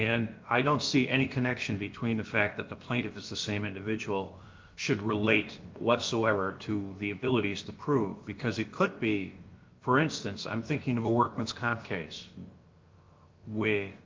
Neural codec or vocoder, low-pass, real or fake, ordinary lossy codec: codec, 16 kHz, about 1 kbps, DyCAST, with the encoder's durations; 7.2 kHz; fake; Opus, 32 kbps